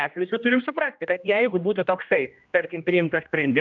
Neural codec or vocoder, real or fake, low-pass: codec, 16 kHz, 1 kbps, X-Codec, HuBERT features, trained on general audio; fake; 7.2 kHz